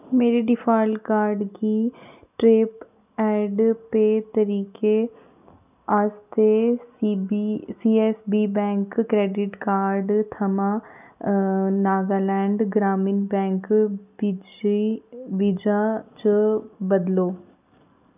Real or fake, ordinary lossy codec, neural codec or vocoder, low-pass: real; none; none; 3.6 kHz